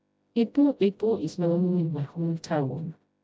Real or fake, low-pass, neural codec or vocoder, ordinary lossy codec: fake; none; codec, 16 kHz, 0.5 kbps, FreqCodec, smaller model; none